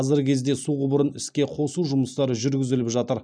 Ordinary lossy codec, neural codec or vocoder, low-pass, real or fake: none; none; 9.9 kHz; real